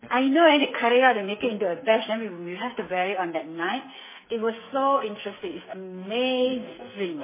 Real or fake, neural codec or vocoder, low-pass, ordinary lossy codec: fake; codec, 44.1 kHz, 2.6 kbps, SNAC; 3.6 kHz; MP3, 16 kbps